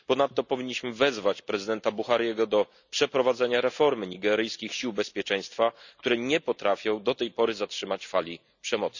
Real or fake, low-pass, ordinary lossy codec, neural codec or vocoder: real; 7.2 kHz; none; none